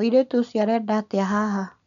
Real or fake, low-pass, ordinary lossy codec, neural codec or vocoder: real; 7.2 kHz; none; none